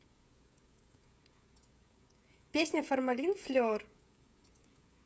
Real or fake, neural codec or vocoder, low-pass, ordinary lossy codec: fake; codec, 16 kHz, 16 kbps, FreqCodec, smaller model; none; none